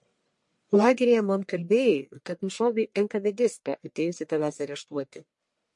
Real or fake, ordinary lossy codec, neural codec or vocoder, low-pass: fake; MP3, 48 kbps; codec, 44.1 kHz, 1.7 kbps, Pupu-Codec; 10.8 kHz